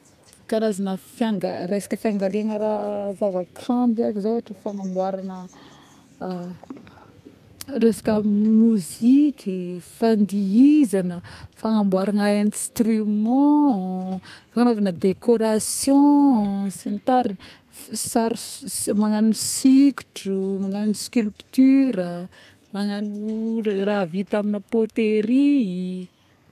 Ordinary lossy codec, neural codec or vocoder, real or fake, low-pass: none; codec, 32 kHz, 1.9 kbps, SNAC; fake; 14.4 kHz